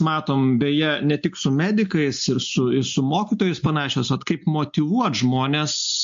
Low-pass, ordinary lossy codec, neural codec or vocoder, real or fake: 7.2 kHz; MP3, 48 kbps; none; real